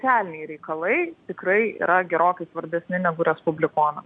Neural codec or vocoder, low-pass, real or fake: none; 9.9 kHz; real